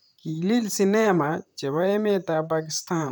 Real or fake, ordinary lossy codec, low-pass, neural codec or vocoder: fake; none; none; vocoder, 44.1 kHz, 128 mel bands, Pupu-Vocoder